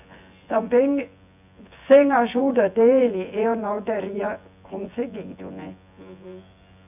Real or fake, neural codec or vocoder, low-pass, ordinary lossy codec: fake; vocoder, 24 kHz, 100 mel bands, Vocos; 3.6 kHz; none